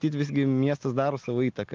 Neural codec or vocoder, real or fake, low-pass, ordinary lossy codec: none; real; 7.2 kHz; Opus, 32 kbps